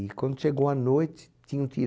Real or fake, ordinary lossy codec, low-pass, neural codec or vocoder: real; none; none; none